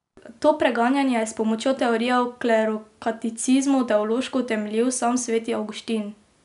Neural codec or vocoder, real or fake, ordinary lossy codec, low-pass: none; real; none; 10.8 kHz